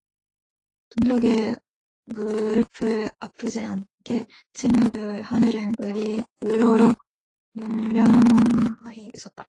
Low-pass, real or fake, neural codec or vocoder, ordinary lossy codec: 10.8 kHz; fake; autoencoder, 48 kHz, 32 numbers a frame, DAC-VAE, trained on Japanese speech; AAC, 32 kbps